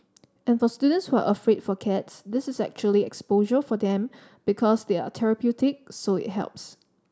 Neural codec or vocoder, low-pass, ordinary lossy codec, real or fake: none; none; none; real